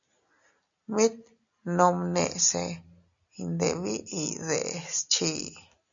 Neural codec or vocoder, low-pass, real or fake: none; 7.2 kHz; real